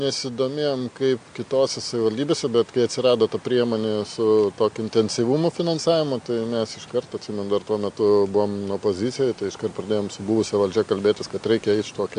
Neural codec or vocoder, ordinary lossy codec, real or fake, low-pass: none; AAC, 64 kbps; real; 9.9 kHz